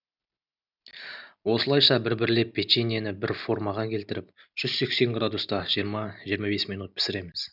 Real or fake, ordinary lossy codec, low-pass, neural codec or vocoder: real; none; 5.4 kHz; none